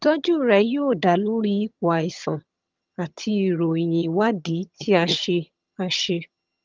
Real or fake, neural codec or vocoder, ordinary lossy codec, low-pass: fake; vocoder, 22.05 kHz, 80 mel bands, HiFi-GAN; Opus, 32 kbps; 7.2 kHz